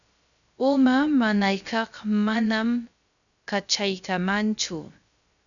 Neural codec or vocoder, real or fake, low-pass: codec, 16 kHz, 0.3 kbps, FocalCodec; fake; 7.2 kHz